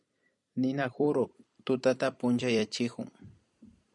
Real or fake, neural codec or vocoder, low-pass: fake; vocoder, 44.1 kHz, 128 mel bands every 256 samples, BigVGAN v2; 10.8 kHz